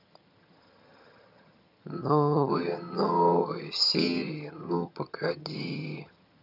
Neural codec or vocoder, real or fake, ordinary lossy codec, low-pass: vocoder, 22.05 kHz, 80 mel bands, HiFi-GAN; fake; none; 5.4 kHz